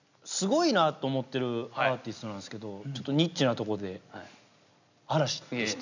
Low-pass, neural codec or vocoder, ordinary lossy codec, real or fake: 7.2 kHz; none; none; real